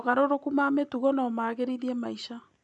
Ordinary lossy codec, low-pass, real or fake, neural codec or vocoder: none; 10.8 kHz; real; none